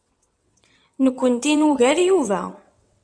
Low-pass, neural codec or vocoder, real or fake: 9.9 kHz; vocoder, 22.05 kHz, 80 mel bands, WaveNeXt; fake